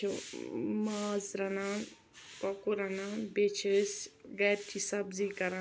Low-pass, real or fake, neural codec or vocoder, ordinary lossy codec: none; real; none; none